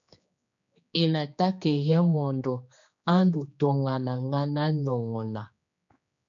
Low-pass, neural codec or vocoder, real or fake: 7.2 kHz; codec, 16 kHz, 2 kbps, X-Codec, HuBERT features, trained on general audio; fake